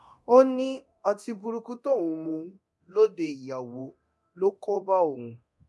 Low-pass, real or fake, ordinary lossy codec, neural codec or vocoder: none; fake; none; codec, 24 kHz, 0.9 kbps, DualCodec